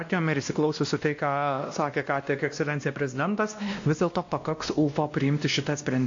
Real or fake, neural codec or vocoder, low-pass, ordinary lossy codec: fake; codec, 16 kHz, 1 kbps, X-Codec, WavLM features, trained on Multilingual LibriSpeech; 7.2 kHz; AAC, 48 kbps